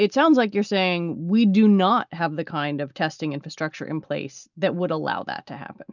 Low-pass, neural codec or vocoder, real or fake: 7.2 kHz; none; real